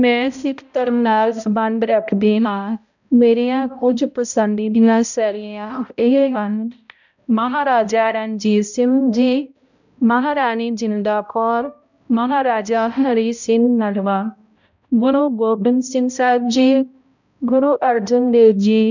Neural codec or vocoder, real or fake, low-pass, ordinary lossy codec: codec, 16 kHz, 0.5 kbps, X-Codec, HuBERT features, trained on balanced general audio; fake; 7.2 kHz; none